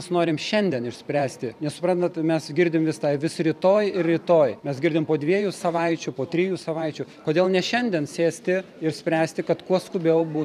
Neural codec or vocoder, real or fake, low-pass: vocoder, 44.1 kHz, 128 mel bands every 512 samples, BigVGAN v2; fake; 14.4 kHz